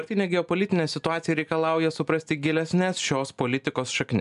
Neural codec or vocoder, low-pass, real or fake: none; 10.8 kHz; real